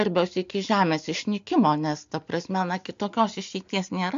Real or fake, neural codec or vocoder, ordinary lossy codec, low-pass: real; none; AAC, 64 kbps; 7.2 kHz